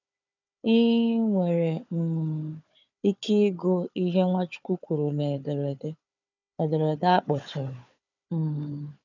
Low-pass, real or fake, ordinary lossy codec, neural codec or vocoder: 7.2 kHz; fake; none; codec, 16 kHz, 4 kbps, FunCodec, trained on Chinese and English, 50 frames a second